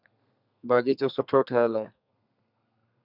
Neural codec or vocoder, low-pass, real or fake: codec, 32 kHz, 1.9 kbps, SNAC; 5.4 kHz; fake